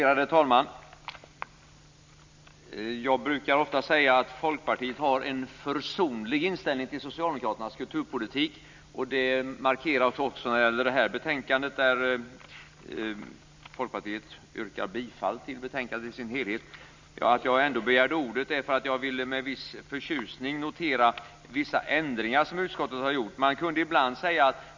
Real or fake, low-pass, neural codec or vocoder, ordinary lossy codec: real; 7.2 kHz; none; MP3, 64 kbps